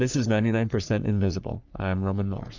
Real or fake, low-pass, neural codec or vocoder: fake; 7.2 kHz; codec, 44.1 kHz, 3.4 kbps, Pupu-Codec